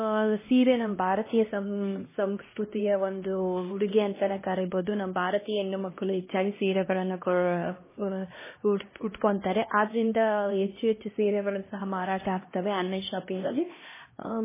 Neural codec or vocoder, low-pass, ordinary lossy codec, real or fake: codec, 16 kHz, 1 kbps, X-Codec, HuBERT features, trained on LibriSpeech; 3.6 kHz; MP3, 16 kbps; fake